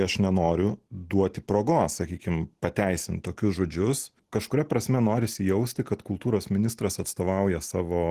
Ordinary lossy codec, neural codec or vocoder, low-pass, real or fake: Opus, 16 kbps; none; 14.4 kHz; real